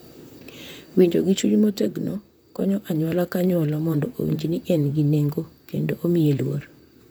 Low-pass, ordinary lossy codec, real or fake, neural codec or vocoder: none; none; fake; vocoder, 44.1 kHz, 128 mel bands, Pupu-Vocoder